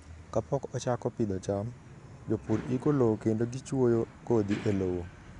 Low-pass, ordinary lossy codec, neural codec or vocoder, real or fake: 10.8 kHz; none; none; real